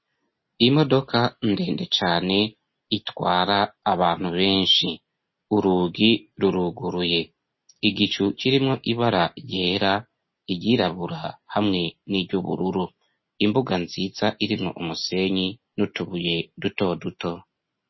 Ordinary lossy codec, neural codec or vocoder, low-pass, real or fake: MP3, 24 kbps; none; 7.2 kHz; real